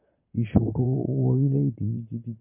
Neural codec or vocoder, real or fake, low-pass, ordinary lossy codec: vocoder, 44.1 kHz, 128 mel bands, Pupu-Vocoder; fake; 3.6 kHz; MP3, 24 kbps